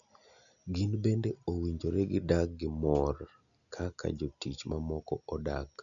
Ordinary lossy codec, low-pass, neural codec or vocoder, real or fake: none; 7.2 kHz; none; real